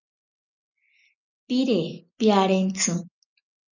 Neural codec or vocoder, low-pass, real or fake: none; 7.2 kHz; real